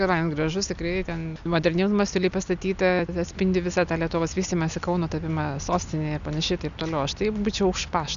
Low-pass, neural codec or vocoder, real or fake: 7.2 kHz; none; real